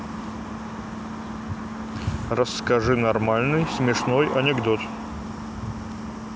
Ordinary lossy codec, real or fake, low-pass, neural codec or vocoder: none; real; none; none